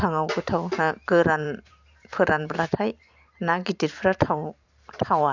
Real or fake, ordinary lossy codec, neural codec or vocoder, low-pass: fake; none; vocoder, 44.1 kHz, 128 mel bands every 512 samples, BigVGAN v2; 7.2 kHz